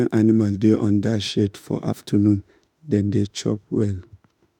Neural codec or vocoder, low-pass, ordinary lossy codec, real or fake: autoencoder, 48 kHz, 32 numbers a frame, DAC-VAE, trained on Japanese speech; 19.8 kHz; none; fake